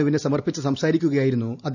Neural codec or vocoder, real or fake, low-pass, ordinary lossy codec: none; real; 7.2 kHz; none